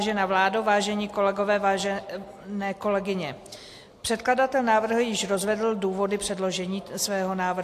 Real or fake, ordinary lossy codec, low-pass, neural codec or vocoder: real; AAC, 64 kbps; 14.4 kHz; none